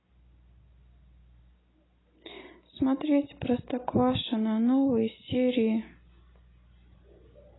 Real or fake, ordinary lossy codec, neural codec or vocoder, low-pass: real; AAC, 16 kbps; none; 7.2 kHz